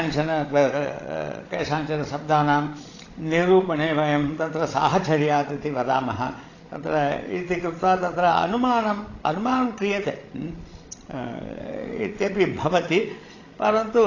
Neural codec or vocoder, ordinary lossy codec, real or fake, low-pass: codec, 16 kHz, 16 kbps, FreqCodec, larger model; AAC, 32 kbps; fake; 7.2 kHz